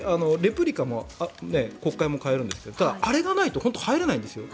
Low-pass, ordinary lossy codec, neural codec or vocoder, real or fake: none; none; none; real